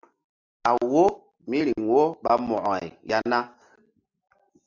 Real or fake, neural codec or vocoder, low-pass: real; none; 7.2 kHz